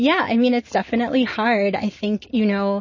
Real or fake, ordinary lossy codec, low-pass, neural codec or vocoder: real; MP3, 32 kbps; 7.2 kHz; none